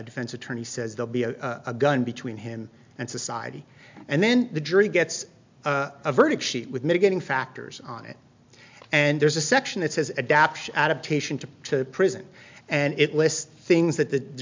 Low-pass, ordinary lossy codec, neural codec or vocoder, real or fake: 7.2 kHz; MP3, 64 kbps; none; real